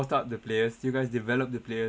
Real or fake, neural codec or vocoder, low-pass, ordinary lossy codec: real; none; none; none